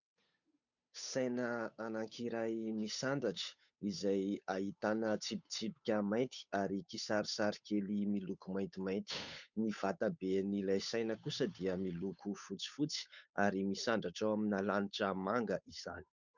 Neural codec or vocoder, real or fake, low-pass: codec, 16 kHz, 8 kbps, FunCodec, trained on Chinese and English, 25 frames a second; fake; 7.2 kHz